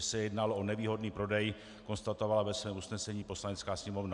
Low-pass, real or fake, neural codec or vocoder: 10.8 kHz; real; none